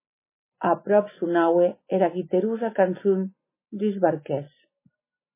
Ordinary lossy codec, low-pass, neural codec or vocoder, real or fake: MP3, 16 kbps; 3.6 kHz; none; real